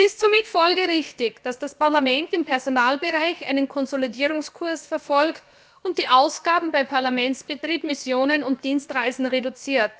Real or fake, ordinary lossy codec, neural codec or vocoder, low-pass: fake; none; codec, 16 kHz, about 1 kbps, DyCAST, with the encoder's durations; none